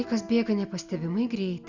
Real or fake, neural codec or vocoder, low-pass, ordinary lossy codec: real; none; 7.2 kHz; Opus, 64 kbps